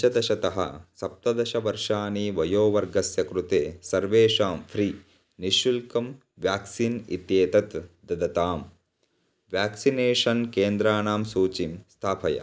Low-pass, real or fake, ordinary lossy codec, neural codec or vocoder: none; real; none; none